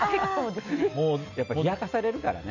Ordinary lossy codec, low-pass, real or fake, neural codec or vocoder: none; 7.2 kHz; real; none